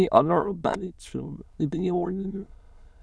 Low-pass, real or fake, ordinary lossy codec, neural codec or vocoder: none; fake; none; autoencoder, 22.05 kHz, a latent of 192 numbers a frame, VITS, trained on many speakers